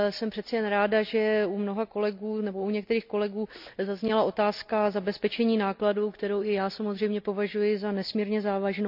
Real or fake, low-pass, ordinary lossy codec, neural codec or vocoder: real; 5.4 kHz; none; none